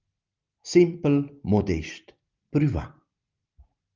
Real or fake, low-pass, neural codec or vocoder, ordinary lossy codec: real; 7.2 kHz; none; Opus, 32 kbps